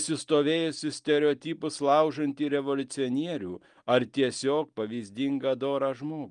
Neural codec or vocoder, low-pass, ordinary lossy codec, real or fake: none; 9.9 kHz; Opus, 32 kbps; real